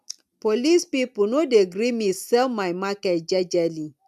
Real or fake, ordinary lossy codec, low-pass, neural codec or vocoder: real; none; 14.4 kHz; none